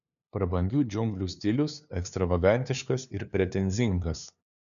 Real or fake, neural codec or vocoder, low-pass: fake; codec, 16 kHz, 2 kbps, FunCodec, trained on LibriTTS, 25 frames a second; 7.2 kHz